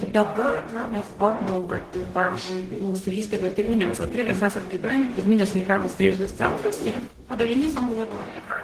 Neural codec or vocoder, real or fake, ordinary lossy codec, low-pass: codec, 44.1 kHz, 0.9 kbps, DAC; fake; Opus, 16 kbps; 14.4 kHz